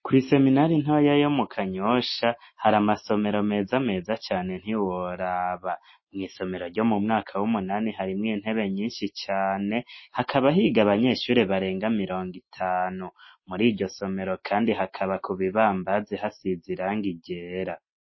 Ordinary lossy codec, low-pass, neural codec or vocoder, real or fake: MP3, 24 kbps; 7.2 kHz; none; real